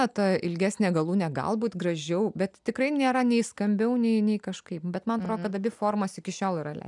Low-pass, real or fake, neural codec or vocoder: 10.8 kHz; real; none